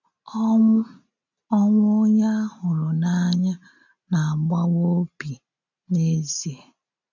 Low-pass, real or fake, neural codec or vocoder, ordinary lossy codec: 7.2 kHz; real; none; none